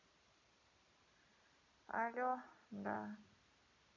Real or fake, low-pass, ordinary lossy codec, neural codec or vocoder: real; 7.2 kHz; MP3, 64 kbps; none